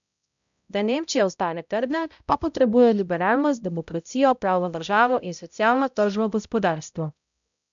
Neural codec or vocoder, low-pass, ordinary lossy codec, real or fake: codec, 16 kHz, 0.5 kbps, X-Codec, HuBERT features, trained on balanced general audio; 7.2 kHz; none; fake